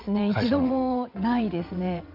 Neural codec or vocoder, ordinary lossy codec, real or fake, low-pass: vocoder, 22.05 kHz, 80 mel bands, WaveNeXt; none; fake; 5.4 kHz